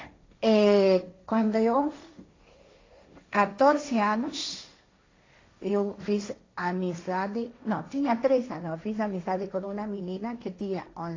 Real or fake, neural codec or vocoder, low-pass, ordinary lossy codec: fake; codec, 16 kHz, 1.1 kbps, Voila-Tokenizer; 7.2 kHz; AAC, 32 kbps